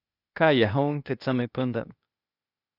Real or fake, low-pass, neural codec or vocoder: fake; 5.4 kHz; codec, 16 kHz, 0.8 kbps, ZipCodec